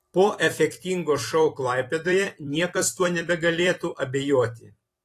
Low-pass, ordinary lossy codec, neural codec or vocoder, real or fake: 14.4 kHz; AAC, 48 kbps; vocoder, 44.1 kHz, 128 mel bands every 256 samples, BigVGAN v2; fake